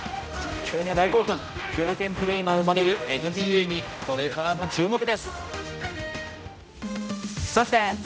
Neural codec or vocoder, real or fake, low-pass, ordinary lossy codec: codec, 16 kHz, 0.5 kbps, X-Codec, HuBERT features, trained on general audio; fake; none; none